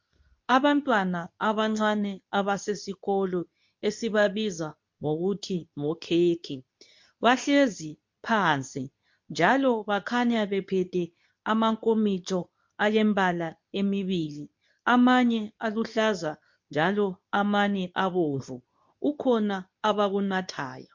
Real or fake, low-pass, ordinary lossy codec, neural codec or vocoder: fake; 7.2 kHz; MP3, 48 kbps; codec, 24 kHz, 0.9 kbps, WavTokenizer, medium speech release version 2